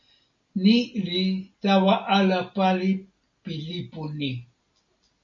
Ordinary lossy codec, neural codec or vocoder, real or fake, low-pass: MP3, 48 kbps; none; real; 7.2 kHz